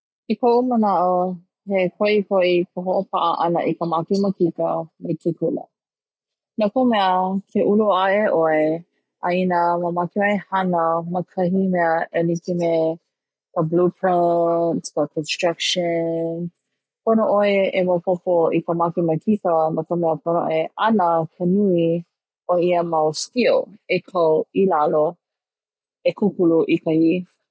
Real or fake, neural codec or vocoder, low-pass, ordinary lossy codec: real; none; none; none